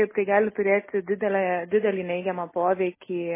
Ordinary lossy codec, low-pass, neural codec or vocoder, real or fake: MP3, 16 kbps; 3.6 kHz; none; real